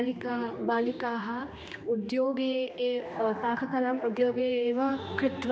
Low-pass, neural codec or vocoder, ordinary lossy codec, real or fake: none; codec, 16 kHz, 2 kbps, X-Codec, HuBERT features, trained on general audio; none; fake